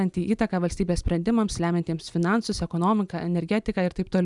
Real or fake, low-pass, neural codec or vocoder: real; 10.8 kHz; none